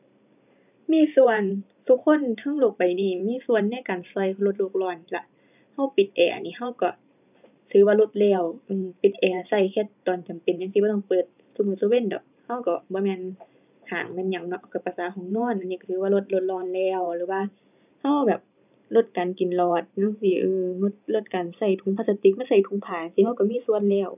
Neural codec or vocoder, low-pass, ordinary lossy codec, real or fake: vocoder, 44.1 kHz, 128 mel bands every 512 samples, BigVGAN v2; 3.6 kHz; none; fake